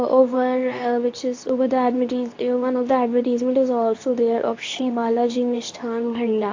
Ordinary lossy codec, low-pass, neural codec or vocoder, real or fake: AAC, 48 kbps; 7.2 kHz; codec, 24 kHz, 0.9 kbps, WavTokenizer, medium speech release version 2; fake